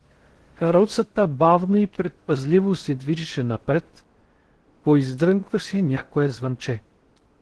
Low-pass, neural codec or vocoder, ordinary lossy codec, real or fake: 10.8 kHz; codec, 16 kHz in and 24 kHz out, 0.6 kbps, FocalCodec, streaming, 4096 codes; Opus, 16 kbps; fake